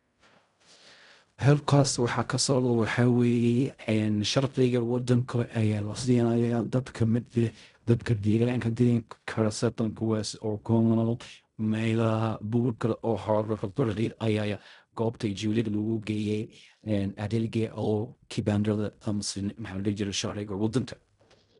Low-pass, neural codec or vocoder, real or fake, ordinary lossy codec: 10.8 kHz; codec, 16 kHz in and 24 kHz out, 0.4 kbps, LongCat-Audio-Codec, fine tuned four codebook decoder; fake; none